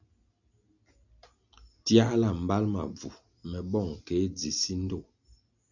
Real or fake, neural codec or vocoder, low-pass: real; none; 7.2 kHz